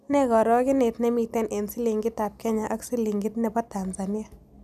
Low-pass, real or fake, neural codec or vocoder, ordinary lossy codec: 14.4 kHz; real; none; none